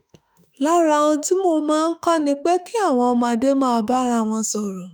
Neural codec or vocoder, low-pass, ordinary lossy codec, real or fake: autoencoder, 48 kHz, 32 numbers a frame, DAC-VAE, trained on Japanese speech; none; none; fake